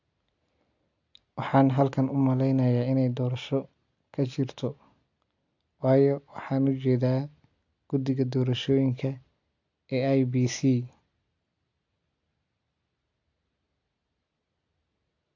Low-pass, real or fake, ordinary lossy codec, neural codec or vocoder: 7.2 kHz; real; AAC, 48 kbps; none